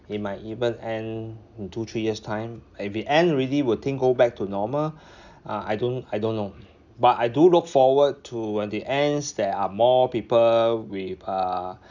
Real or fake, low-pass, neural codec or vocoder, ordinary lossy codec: real; 7.2 kHz; none; none